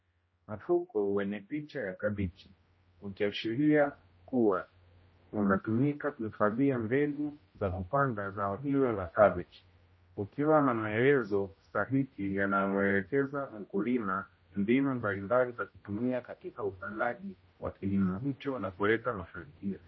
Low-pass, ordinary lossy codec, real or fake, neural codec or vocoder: 7.2 kHz; MP3, 24 kbps; fake; codec, 16 kHz, 0.5 kbps, X-Codec, HuBERT features, trained on general audio